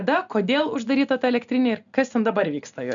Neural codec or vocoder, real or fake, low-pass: none; real; 7.2 kHz